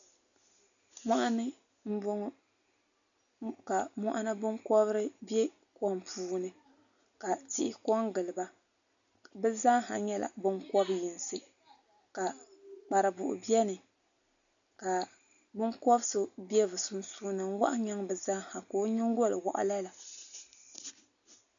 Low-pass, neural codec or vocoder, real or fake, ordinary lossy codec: 7.2 kHz; none; real; MP3, 96 kbps